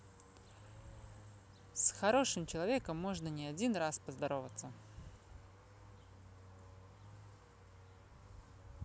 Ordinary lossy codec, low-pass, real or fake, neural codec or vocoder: none; none; real; none